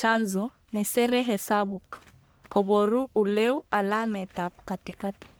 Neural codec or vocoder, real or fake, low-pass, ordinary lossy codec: codec, 44.1 kHz, 1.7 kbps, Pupu-Codec; fake; none; none